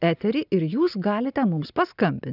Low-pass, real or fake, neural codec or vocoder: 5.4 kHz; real; none